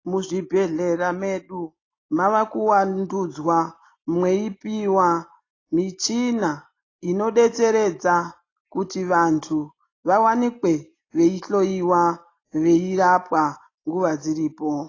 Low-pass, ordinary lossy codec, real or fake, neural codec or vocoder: 7.2 kHz; AAC, 32 kbps; real; none